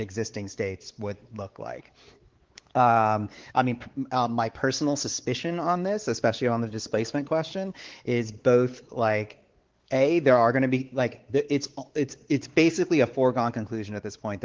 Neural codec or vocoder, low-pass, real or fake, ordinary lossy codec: codec, 16 kHz, 4 kbps, X-Codec, WavLM features, trained on Multilingual LibriSpeech; 7.2 kHz; fake; Opus, 16 kbps